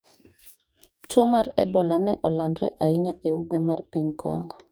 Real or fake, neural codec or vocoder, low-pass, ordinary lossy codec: fake; codec, 44.1 kHz, 2.6 kbps, DAC; none; none